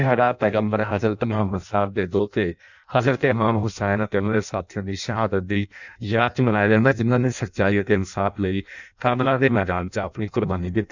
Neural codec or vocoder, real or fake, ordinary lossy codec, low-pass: codec, 16 kHz in and 24 kHz out, 0.6 kbps, FireRedTTS-2 codec; fake; none; 7.2 kHz